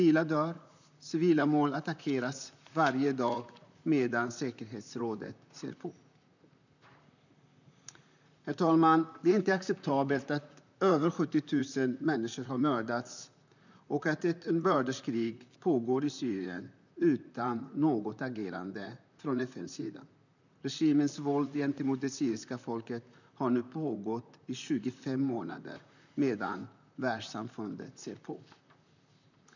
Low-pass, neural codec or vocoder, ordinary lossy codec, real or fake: 7.2 kHz; vocoder, 44.1 kHz, 128 mel bands, Pupu-Vocoder; none; fake